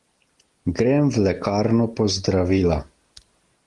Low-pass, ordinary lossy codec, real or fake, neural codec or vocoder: 10.8 kHz; Opus, 24 kbps; real; none